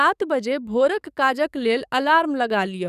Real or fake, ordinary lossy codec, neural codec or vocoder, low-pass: fake; none; codec, 44.1 kHz, 7.8 kbps, DAC; 14.4 kHz